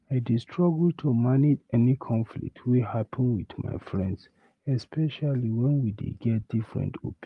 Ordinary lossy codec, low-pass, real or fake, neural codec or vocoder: Opus, 32 kbps; 10.8 kHz; real; none